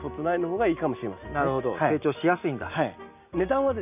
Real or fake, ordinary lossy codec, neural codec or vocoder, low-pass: real; none; none; 3.6 kHz